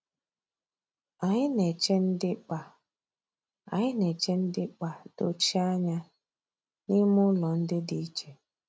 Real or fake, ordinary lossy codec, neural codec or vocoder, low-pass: real; none; none; none